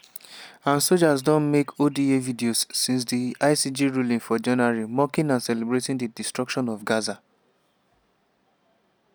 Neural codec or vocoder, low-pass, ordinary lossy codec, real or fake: none; none; none; real